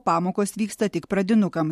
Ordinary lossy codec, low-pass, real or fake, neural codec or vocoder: MP3, 64 kbps; 14.4 kHz; real; none